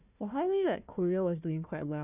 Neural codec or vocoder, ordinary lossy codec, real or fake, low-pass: codec, 16 kHz, 1 kbps, FunCodec, trained on Chinese and English, 50 frames a second; none; fake; 3.6 kHz